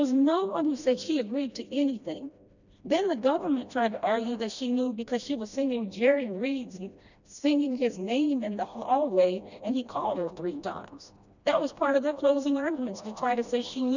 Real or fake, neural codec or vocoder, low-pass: fake; codec, 16 kHz, 1 kbps, FreqCodec, smaller model; 7.2 kHz